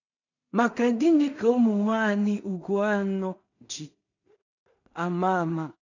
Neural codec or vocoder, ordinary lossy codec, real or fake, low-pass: codec, 16 kHz in and 24 kHz out, 0.4 kbps, LongCat-Audio-Codec, two codebook decoder; none; fake; 7.2 kHz